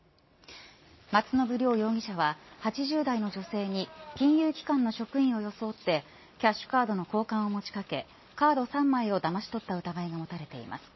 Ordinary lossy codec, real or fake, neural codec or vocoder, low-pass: MP3, 24 kbps; real; none; 7.2 kHz